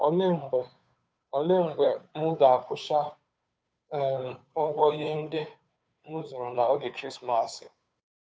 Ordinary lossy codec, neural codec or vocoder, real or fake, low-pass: none; codec, 16 kHz, 2 kbps, FunCodec, trained on Chinese and English, 25 frames a second; fake; none